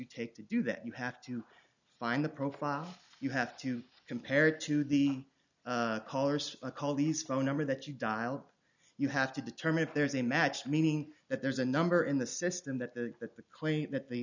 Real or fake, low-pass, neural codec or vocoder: real; 7.2 kHz; none